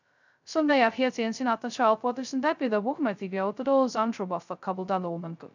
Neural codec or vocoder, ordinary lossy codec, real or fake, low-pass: codec, 16 kHz, 0.2 kbps, FocalCodec; none; fake; 7.2 kHz